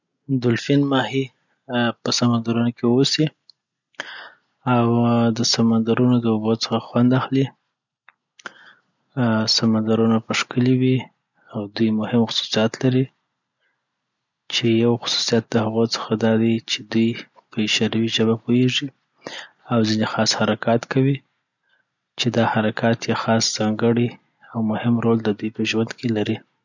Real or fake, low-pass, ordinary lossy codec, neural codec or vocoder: real; 7.2 kHz; none; none